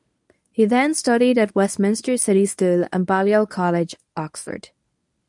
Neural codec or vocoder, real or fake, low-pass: codec, 24 kHz, 0.9 kbps, WavTokenizer, medium speech release version 1; fake; 10.8 kHz